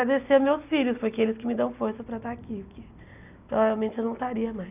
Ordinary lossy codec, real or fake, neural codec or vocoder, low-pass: none; real; none; 3.6 kHz